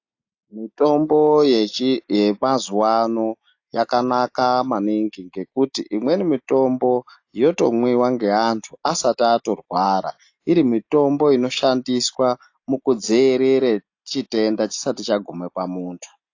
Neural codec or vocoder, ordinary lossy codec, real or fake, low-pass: none; AAC, 48 kbps; real; 7.2 kHz